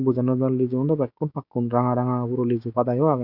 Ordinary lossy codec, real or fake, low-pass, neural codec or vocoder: none; fake; 5.4 kHz; codec, 16 kHz, 6 kbps, DAC